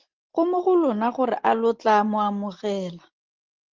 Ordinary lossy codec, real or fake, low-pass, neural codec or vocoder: Opus, 16 kbps; real; 7.2 kHz; none